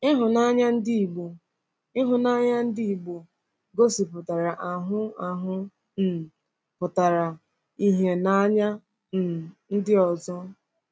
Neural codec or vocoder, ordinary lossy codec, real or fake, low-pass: none; none; real; none